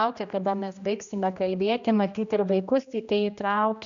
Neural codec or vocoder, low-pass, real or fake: codec, 16 kHz, 1 kbps, X-Codec, HuBERT features, trained on general audio; 7.2 kHz; fake